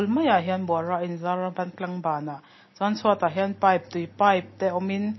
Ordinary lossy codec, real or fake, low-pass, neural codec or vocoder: MP3, 24 kbps; real; 7.2 kHz; none